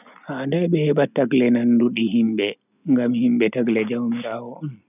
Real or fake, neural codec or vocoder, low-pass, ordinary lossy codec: real; none; 3.6 kHz; none